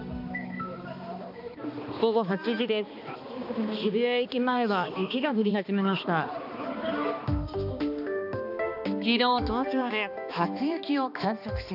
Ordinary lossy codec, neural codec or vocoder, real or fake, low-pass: none; codec, 16 kHz, 2 kbps, X-Codec, HuBERT features, trained on balanced general audio; fake; 5.4 kHz